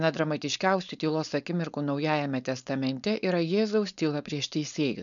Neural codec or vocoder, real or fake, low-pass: codec, 16 kHz, 4.8 kbps, FACodec; fake; 7.2 kHz